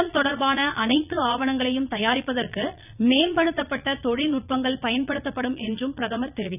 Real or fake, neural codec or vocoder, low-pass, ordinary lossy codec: fake; vocoder, 22.05 kHz, 80 mel bands, Vocos; 3.6 kHz; none